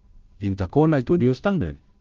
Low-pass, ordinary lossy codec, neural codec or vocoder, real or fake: 7.2 kHz; Opus, 32 kbps; codec, 16 kHz, 0.5 kbps, FunCodec, trained on Chinese and English, 25 frames a second; fake